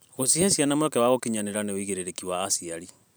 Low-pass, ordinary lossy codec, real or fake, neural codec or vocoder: none; none; fake; vocoder, 44.1 kHz, 128 mel bands every 512 samples, BigVGAN v2